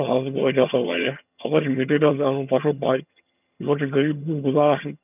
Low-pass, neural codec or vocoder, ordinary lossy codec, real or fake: 3.6 kHz; vocoder, 22.05 kHz, 80 mel bands, HiFi-GAN; none; fake